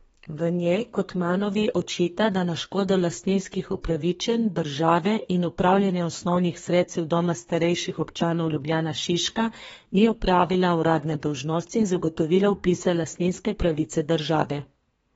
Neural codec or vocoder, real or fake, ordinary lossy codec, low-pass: codec, 32 kHz, 1.9 kbps, SNAC; fake; AAC, 24 kbps; 14.4 kHz